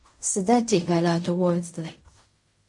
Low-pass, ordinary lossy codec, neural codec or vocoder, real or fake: 10.8 kHz; MP3, 48 kbps; codec, 16 kHz in and 24 kHz out, 0.4 kbps, LongCat-Audio-Codec, fine tuned four codebook decoder; fake